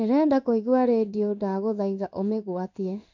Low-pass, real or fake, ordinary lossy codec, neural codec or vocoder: 7.2 kHz; fake; none; codec, 16 kHz in and 24 kHz out, 1 kbps, XY-Tokenizer